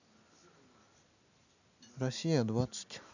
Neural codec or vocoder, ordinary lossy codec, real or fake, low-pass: none; none; real; 7.2 kHz